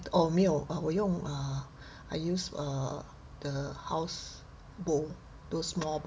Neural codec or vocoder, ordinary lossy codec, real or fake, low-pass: none; none; real; none